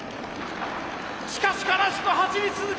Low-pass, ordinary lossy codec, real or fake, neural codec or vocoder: none; none; real; none